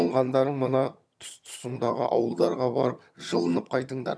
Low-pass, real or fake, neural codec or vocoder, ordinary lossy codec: none; fake; vocoder, 22.05 kHz, 80 mel bands, HiFi-GAN; none